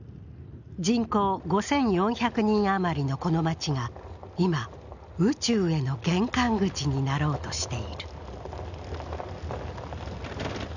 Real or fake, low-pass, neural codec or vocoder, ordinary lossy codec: real; 7.2 kHz; none; none